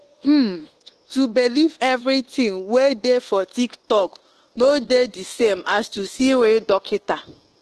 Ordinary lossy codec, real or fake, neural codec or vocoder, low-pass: Opus, 16 kbps; fake; codec, 24 kHz, 1.2 kbps, DualCodec; 10.8 kHz